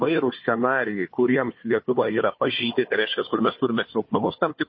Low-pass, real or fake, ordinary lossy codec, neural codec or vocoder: 7.2 kHz; fake; MP3, 24 kbps; codec, 16 kHz, 4 kbps, FunCodec, trained on Chinese and English, 50 frames a second